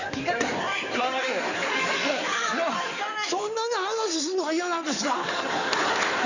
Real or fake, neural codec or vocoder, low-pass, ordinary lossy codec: fake; codec, 16 kHz in and 24 kHz out, 2.2 kbps, FireRedTTS-2 codec; 7.2 kHz; none